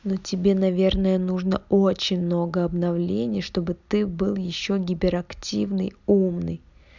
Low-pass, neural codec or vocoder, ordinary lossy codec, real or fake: 7.2 kHz; none; none; real